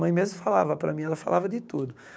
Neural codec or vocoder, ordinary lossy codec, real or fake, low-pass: codec, 16 kHz, 6 kbps, DAC; none; fake; none